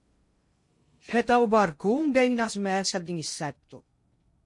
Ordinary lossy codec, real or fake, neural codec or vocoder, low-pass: MP3, 48 kbps; fake; codec, 16 kHz in and 24 kHz out, 0.6 kbps, FocalCodec, streaming, 2048 codes; 10.8 kHz